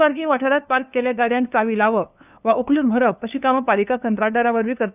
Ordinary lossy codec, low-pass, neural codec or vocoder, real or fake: none; 3.6 kHz; codec, 16 kHz, 2 kbps, FunCodec, trained on LibriTTS, 25 frames a second; fake